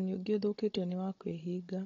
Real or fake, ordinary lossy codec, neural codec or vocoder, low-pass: fake; AAC, 32 kbps; codec, 16 kHz, 16 kbps, FunCodec, trained on Chinese and English, 50 frames a second; 7.2 kHz